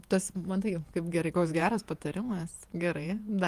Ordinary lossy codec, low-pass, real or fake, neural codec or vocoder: Opus, 32 kbps; 14.4 kHz; fake; vocoder, 48 kHz, 128 mel bands, Vocos